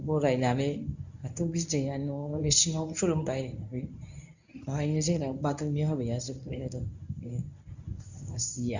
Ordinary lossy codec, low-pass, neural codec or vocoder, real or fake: none; 7.2 kHz; codec, 24 kHz, 0.9 kbps, WavTokenizer, medium speech release version 1; fake